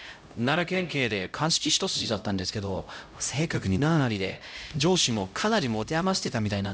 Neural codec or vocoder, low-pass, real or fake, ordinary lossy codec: codec, 16 kHz, 0.5 kbps, X-Codec, HuBERT features, trained on LibriSpeech; none; fake; none